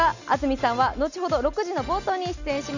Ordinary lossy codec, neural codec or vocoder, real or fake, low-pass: none; none; real; 7.2 kHz